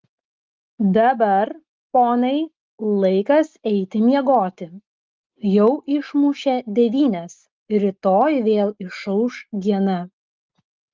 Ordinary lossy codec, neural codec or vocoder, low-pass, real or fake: Opus, 32 kbps; none; 7.2 kHz; real